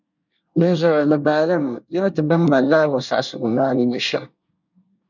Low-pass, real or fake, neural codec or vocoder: 7.2 kHz; fake; codec, 24 kHz, 1 kbps, SNAC